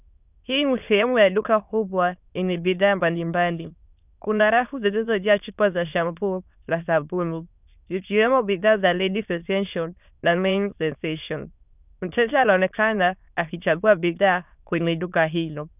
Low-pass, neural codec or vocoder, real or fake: 3.6 kHz; autoencoder, 22.05 kHz, a latent of 192 numbers a frame, VITS, trained on many speakers; fake